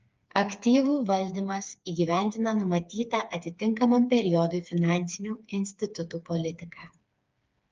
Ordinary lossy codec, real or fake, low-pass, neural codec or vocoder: Opus, 24 kbps; fake; 7.2 kHz; codec, 16 kHz, 4 kbps, FreqCodec, smaller model